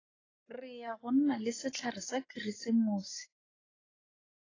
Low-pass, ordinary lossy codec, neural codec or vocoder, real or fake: 7.2 kHz; AAC, 32 kbps; codec, 44.1 kHz, 7.8 kbps, Pupu-Codec; fake